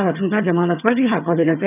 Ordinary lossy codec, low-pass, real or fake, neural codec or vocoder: none; 3.6 kHz; fake; vocoder, 22.05 kHz, 80 mel bands, HiFi-GAN